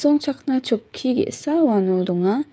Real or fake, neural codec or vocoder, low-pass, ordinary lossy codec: fake; codec, 16 kHz, 16 kbps, FreqCodec, smaller model; none; none